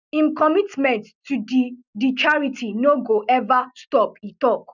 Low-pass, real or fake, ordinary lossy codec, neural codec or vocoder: 7.2 kHz; real; none; none